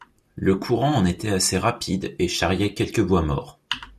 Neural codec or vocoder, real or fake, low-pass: vocoder, 44.1 kHz, 128 mel bands every 256 samples, BigVGAN v2; fake; 14.4 kHz